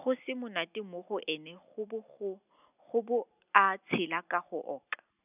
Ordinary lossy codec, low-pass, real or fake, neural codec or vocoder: none; 3.6 kHz; real; none